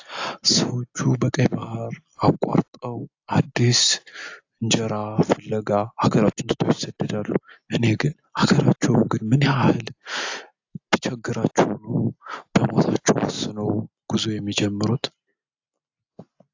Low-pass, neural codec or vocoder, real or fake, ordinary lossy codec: 7.2 kHz; none; real; AAC, 48 kbps